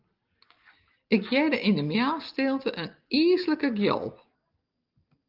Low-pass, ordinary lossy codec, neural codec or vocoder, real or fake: 5.4 kHz; Opus, 24 kbps; none; real